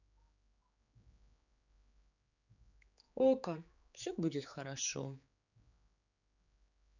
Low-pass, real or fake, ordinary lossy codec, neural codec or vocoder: 7.2 kHz; fake; none; codec, 16 kHz, 4 kbps, X-Codec, HuBERT features, trained on general audio